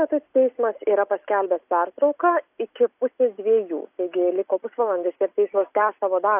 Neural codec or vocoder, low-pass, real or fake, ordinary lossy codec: none; 3.6 kHz; real; AAC, 32 kbps